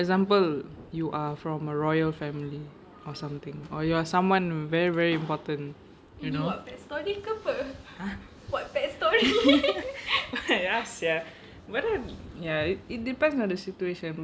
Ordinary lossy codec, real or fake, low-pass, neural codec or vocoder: none; real; none; none